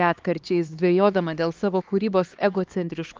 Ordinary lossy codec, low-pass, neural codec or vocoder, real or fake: Opus, 24 kbps; 7.2 kHz; codec, 16 kHz, 2 kbps, X-Codec, HuBERT features, trained on LibriSpeech; fake